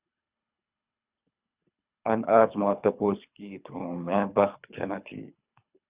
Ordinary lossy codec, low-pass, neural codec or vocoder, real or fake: Opus, 64 kbps; 3.6 kHz; codec, 24 kHz, 3 kbps, HILCodec; fake